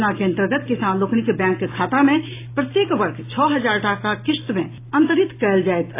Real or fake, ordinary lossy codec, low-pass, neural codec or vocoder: real; AAC, 24 kbps; 3.6 kHz; none